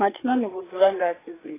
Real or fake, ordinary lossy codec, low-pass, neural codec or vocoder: fake; AAC, 16 kbps; 3.6 kHz; codec, 16 kHz, 4 kbps, FreqCodec, larger model